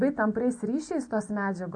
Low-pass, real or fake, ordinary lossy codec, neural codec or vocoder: 10.8 kHz; real; MP3, 48 kbps; none